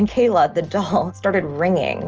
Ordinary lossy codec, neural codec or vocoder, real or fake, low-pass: Opus, 24 kbps; none; real; 7.2 kHz